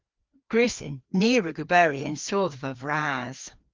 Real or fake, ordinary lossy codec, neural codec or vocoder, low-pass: fake; Opus, 24 kbps; codec, 16 kHz, 2 kbps, FreqCodec, larger model; 7.2 kHz